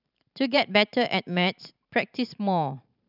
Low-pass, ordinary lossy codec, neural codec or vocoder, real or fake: 5.4 kHz; none; vocoder, 44.1 kHz, 128 mel bands every 512 samples, BigVGAN v2; fake